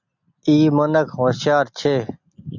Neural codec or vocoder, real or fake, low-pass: none; real; 7.2 kHz